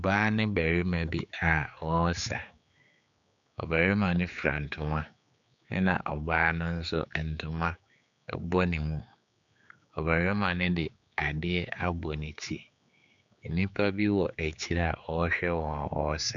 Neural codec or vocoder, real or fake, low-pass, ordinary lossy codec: codec, 16 kHz, 4 kbps, X-Codec, HuBERT features, trained on general audio; fake; 7.2 kHz; MP3, 96 kbps